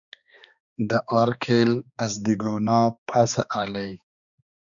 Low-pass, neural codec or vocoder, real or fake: 7.2 kHz; codec, 16 kHz, 2 kbps, X-Codec, HuBERT features, trained on balanced general audio; fake